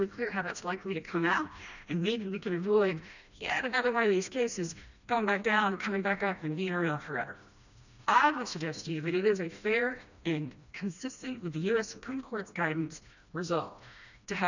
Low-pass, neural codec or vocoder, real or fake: 7.2 kHz; codec, 16 kHz, 1 kbps, FreqCodec, smaller model; fake